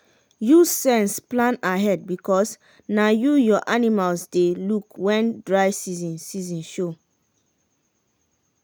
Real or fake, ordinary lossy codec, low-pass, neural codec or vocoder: real; none; none; none